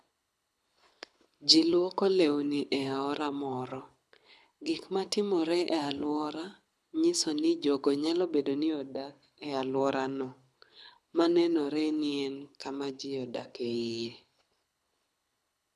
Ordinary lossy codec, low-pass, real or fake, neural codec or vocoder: none; none; fake; codec, 24 kHz, 6 kbps, HILCodec